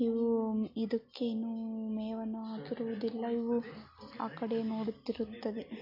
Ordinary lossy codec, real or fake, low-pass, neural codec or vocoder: MP3, 32 kbps; real; 5.4 kHz; none